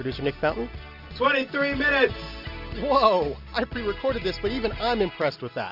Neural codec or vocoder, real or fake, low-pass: none; real; 5.4 kHz